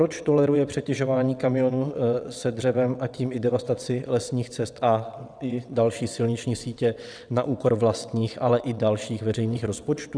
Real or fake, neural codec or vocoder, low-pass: fake; vocoder, 22.05 kHz, 80 mel bands, WaveNeXt; 9.9 kHz